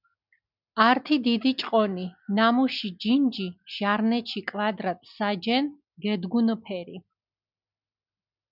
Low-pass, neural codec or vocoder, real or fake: 5.4 kHz; none; real